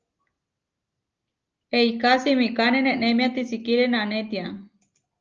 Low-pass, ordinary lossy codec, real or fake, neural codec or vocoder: 7.2 kHz; Opus, 24 kbps; real; none